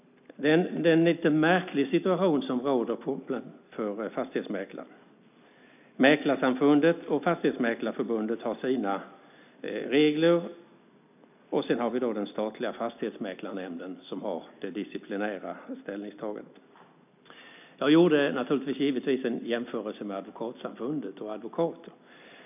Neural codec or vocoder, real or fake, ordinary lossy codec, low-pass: none; real; none; 3.6 kHz